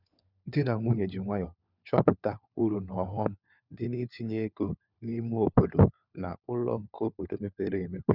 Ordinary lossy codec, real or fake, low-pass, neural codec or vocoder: none; fake; 5.4 kHz; codec, 16 kHz, 4 kbps, FunCodec, trained on LibriTTS, 50 frames a second